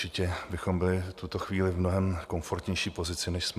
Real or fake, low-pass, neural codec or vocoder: real; 14.4 kHz; none